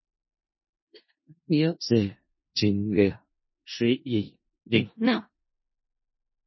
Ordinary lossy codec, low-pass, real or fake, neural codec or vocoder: MP3, 24 kbps; 7.2 kHz; fake; codec, 16 kHz in and 24 kHz out, 0.4 kbps, LongCat-Audio-Codec, four codebook decoder